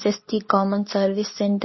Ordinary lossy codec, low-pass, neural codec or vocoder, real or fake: MP3, 24 kbps; 7.2 kHz; codec, 24 kHz, 0.9 kbps, WavTokenizer, medium speech release version 2; fake